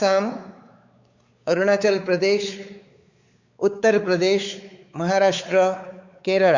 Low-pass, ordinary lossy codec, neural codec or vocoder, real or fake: 7.2 kHz; Opus, 64 kbps; codec, 16 kHz, 4 kbps, X-Codec, WavLM features, trained on Multilingual LibriSpeech; fake